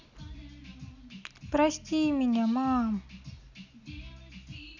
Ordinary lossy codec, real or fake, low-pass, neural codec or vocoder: none; real; 7.2 kHz; none